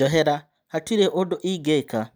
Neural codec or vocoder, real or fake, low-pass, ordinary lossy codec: none; real; none; none